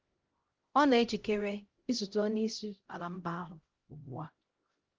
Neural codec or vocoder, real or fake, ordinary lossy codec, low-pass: codec, 16 kHz, 0.5 kbps, X-Codec, HuBERT features, trained on LibriSpeech; fake; Opus, 16 kbps; 7.2 kHz